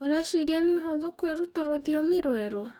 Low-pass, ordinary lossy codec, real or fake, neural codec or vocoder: 19.8 kHz; none; fake; codec, 44.1 kHz, 2.6 kbps, DAC